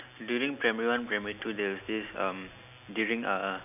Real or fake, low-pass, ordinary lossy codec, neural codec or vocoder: real; 3.6 kHz; none; none